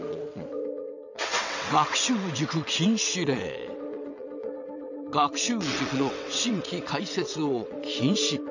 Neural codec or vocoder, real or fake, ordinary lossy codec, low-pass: vocoder, 22.05 kHz, 80 mel bands, WaveNeXt; fake; none; 7.2 kHz